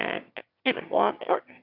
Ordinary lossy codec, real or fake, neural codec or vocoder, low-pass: AAC, 48 kbps; fake; autoencoder, 22.05 kHz, a latent of 192 numbers a frame, VITS, trained on one speaker; 5.4 kHz